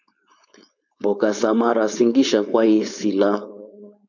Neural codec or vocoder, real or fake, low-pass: codec, 16 kHz, 4.8 kbps, FACodec; fake; 7.2 kHz